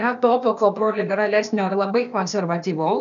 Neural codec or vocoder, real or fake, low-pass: codec, 16 kHz, 0.8 kbps, ZipCodec; fake; 7.2 kHz